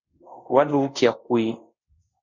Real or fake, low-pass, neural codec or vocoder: fake; 7.2 kHz; codec, 24 kHz, 0.5 kbps, DualCodec